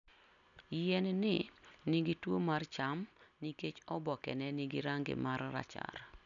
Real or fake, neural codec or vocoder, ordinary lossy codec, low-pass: real; none; none; 7.2 kHz